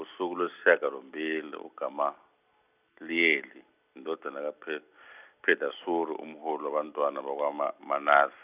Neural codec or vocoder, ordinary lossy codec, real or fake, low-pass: none; none; real; 3.6 kHz